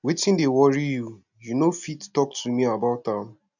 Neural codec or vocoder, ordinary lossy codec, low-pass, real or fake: none; none; 7.2 kHz; real